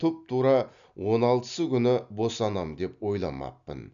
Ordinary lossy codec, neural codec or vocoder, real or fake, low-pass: none; none; real; 7.2 kHz